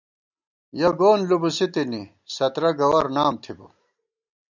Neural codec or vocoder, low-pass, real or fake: none; 7.2 kHz; real